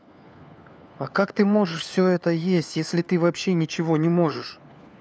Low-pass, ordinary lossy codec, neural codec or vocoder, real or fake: none; none; codec, 16 kHz, 4 kbps, FunCodec, trained on LibriTTS, 50 frames a second; fake